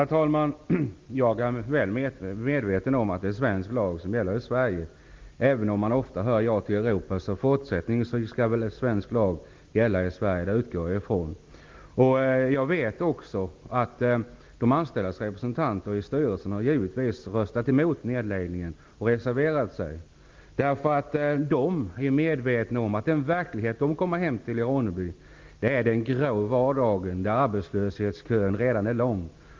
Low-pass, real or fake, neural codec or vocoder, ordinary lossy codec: 7.2 kHz; real; none; Opus, 32 kbps